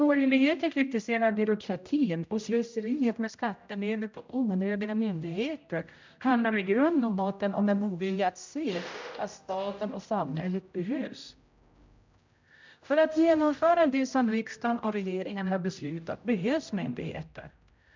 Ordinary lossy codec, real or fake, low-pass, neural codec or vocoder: MP3, 64 kbps; fake; 7.2 kHz; codec, 16 kHz, 0.5 kbps, X-Codec, HuBERT features, trained on general audio